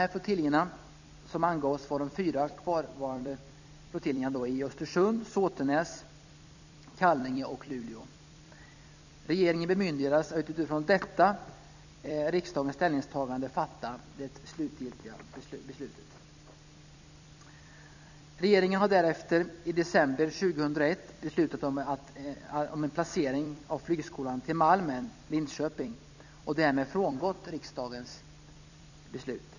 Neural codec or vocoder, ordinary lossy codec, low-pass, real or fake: vocoder, 44.1 kHz, 128 mel bands every 256 samples, BigVGAN v2; none; 7.2 kHz; fake